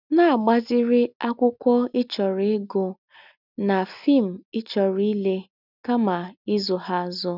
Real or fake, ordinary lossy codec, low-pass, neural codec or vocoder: real; none; 5.4 kHz; none